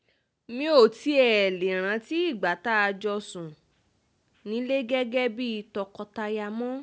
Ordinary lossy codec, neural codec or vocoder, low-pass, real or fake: none; none; none; real